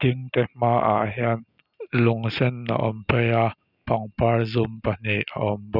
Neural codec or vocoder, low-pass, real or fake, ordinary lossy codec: none; 5.4 kHz; real; none